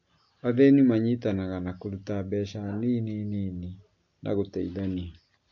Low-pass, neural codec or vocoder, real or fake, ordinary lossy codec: 7.2 kHz; none; real; AAC, 48 kbps